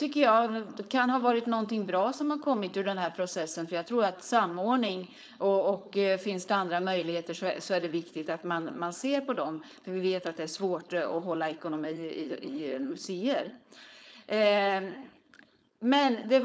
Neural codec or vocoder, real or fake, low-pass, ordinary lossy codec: codec, 16 kHz, 4.8 kbps, FACodec; fake; none; none